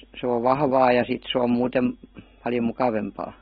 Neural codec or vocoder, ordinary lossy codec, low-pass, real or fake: none; AAC, 16 kbps; 7.2 kHz; real